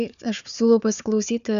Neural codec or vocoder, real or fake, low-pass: codec, 16 kHz, 4 kbps, FunCodec, trained on Chinese and English, 50 frames a second; fake; 7.2 kHz